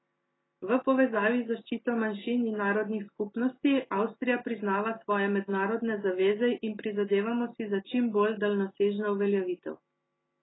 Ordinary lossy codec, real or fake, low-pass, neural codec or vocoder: AAC, 16 kbps; real; 7.2 kHz; none